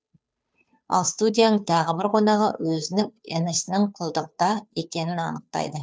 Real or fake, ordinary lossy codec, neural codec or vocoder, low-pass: fake; none; codec, 16 kHz, 2 kbps, FunCodec, trained on Chinese and English, 25 frames a second; none